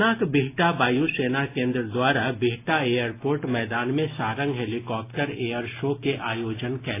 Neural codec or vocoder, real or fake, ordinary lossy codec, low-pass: none; real; AAC, 24 kbps; 3.6 kHz